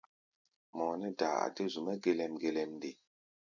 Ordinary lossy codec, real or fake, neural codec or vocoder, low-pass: MP3, 64 kbps; real; none; 7.2 kHz